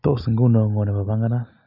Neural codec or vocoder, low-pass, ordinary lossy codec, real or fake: none; 5.4 kHz; none; real